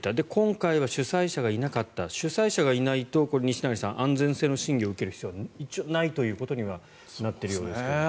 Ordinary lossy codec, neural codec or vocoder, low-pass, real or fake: none; none; none; real